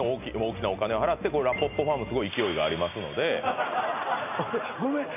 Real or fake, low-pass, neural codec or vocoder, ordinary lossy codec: real; 3.6 kHz; none; none